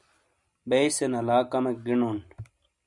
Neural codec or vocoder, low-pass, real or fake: none; 10.8 kHz; real